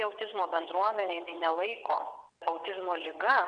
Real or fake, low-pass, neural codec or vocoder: fake; 9.9 kHz; vocoder, 22.05 kHz, 80 mel bands, WaveNeXt